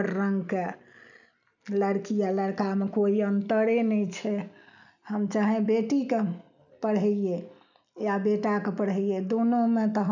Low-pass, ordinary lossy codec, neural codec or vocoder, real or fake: 7.2 kHz; none; none; real